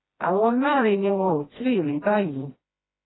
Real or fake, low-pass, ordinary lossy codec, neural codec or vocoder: fake; 7.2 kHz; AAC, 16 kbps; codec, 16 kHz, 1 kbps, FreqCodec, smaller model